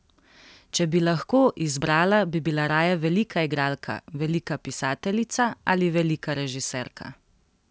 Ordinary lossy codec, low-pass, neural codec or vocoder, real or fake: none; none; none; real